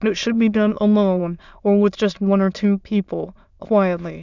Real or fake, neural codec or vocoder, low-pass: fake; autoencoder, 22.05 kHz, a latent of 192 numbers a frame, VITS, trained on many speakers; 7.2 kHz